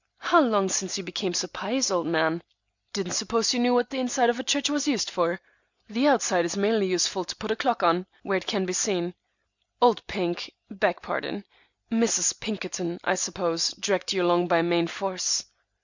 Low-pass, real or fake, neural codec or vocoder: 7.2 kHz; real; none